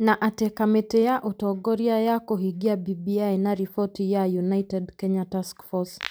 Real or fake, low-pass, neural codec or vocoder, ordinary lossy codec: real; none; none; none